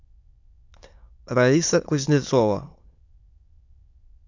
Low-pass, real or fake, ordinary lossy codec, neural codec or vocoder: 7.2 kHz; fake; AAC, 48 kbps; autoencoder, 22.05 kHz, a latent of 192 numbers a frame, VITS, trained on many speakers